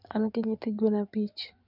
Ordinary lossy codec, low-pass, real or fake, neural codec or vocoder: none; 5.4 kHz; fake; codec, 16 kHz in and 24 kHz out, 2.2 kbps, FireRedTTS-2 codec